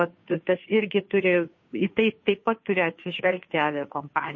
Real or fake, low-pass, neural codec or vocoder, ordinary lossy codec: fake; 7.2 kHz; codec, 16 kHz, 2 kbps, FunCodec, trained on Chinese and English, 25 frames a second; MP3, 32 kbps